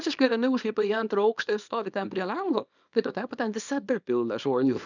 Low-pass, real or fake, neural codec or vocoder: 7.2 kHz; fake; codec, 24 kHz, 0.9 kbps, WavTokenizer, small release